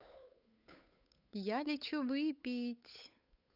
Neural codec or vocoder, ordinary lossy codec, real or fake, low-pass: codec, 16 kHz, 8 kbps, FunCodec, trained on Chinese and English, 25 frames a second; none; fake; 5.4 kHz